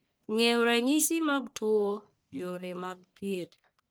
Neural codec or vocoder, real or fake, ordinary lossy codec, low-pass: codec, 44.1 kHz, 1.7 kbps, Pupu-Codec; fake; none; none